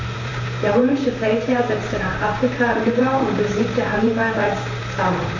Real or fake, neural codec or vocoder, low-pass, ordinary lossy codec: fake; vocoder, 44.1 kHz, 128 mel bands, Pupu-Vocoder; 7.2 kHz; MP3, 64 kbps